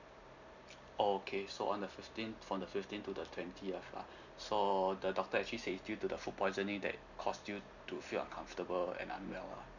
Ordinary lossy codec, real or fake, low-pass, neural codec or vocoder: none; real; 7.2 kHz; none